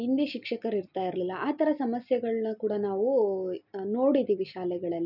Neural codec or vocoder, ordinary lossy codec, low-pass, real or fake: none; none; 5.4 kHz; real